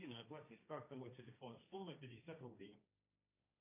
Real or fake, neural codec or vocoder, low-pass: fake; codec, 16 kHz, 1.1 kbps, Voila-Tokenizer; 3.6 kHz